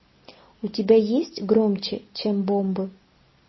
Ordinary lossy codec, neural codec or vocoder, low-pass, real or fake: MP3, 24 kbps; none; 7.2 kHz; real